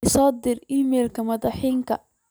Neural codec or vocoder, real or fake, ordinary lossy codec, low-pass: none; real; none; none